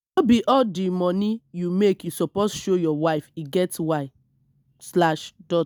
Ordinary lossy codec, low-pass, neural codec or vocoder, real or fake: none; none; none; real